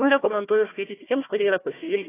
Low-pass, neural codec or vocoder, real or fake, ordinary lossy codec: 3.6 kHz; codec, 16 kHz, 1 kbps, FunCodec, trained on Chinese and English, 50 frames a second; fake; AAC, 16 kbps